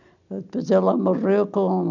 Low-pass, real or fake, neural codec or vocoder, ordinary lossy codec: 7.2 kHz; real; none; none